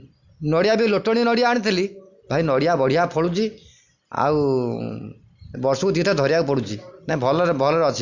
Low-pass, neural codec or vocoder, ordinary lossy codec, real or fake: 7.2 kHz; none; Opus, 64 kbps; real